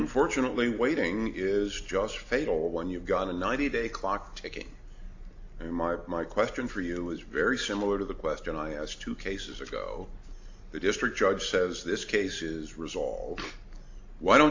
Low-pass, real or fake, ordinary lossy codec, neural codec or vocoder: 7.2 kHz; real; AAC, 48 kbps; none